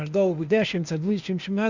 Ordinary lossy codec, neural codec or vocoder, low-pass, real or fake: Opus, 64 kbps; codec, 16 kHz, 0.8 kbps, ZipCodec; 7.2 kHz; fake